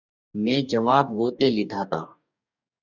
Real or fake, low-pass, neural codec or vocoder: fake; 7.2 kHz; codec, 44.1 kHz, 2.6 kbps, DAC